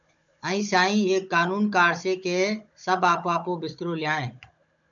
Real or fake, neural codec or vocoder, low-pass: fake; codec, 16 kHz, 16 kbps, FunCodec, trained on Chinese and English, 50 frames a second; 7.2 kHz